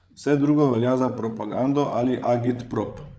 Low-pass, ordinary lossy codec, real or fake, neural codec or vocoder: none; none; fake; codec, 16 kHz, 8 kbps, FreqCodec, larger model